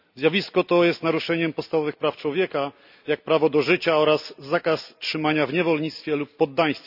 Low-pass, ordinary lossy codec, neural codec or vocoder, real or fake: 5.4 kHz; none; none; real